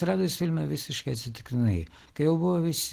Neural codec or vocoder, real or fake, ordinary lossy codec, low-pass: none; real; Opus, 16 kbps; 14.4 kHz